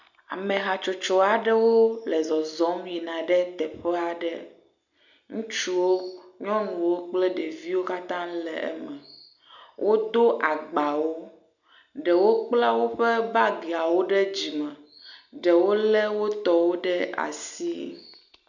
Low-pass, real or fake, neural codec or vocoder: 7.2 kHz; real; none